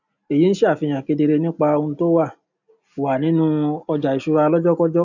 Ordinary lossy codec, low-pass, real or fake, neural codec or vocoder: none; 7.2 kHz; real; none